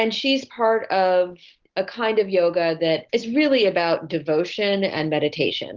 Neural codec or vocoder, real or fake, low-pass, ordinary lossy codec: none; real; 7.2 kHz; Opus, 16 kbps